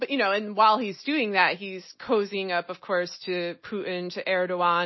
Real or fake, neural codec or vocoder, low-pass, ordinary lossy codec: real; none; 7.2 kHz; MP3, 24 kbps